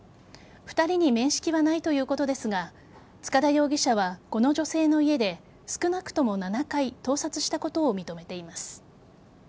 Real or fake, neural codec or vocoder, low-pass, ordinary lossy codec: real; none; none; none